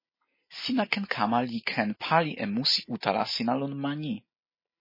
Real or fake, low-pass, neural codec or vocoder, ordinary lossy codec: real; 5.4 kHz; none; MP3, 24 kbps